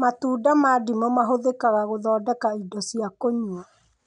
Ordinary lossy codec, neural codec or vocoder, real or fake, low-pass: none; none; real; 9.9 kHz